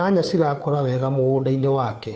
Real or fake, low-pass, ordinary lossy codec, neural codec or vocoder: fake; none; none; codec, 16 kHz, 2 kbps, FunCodec, trained on Chinese and English, 25 frames a second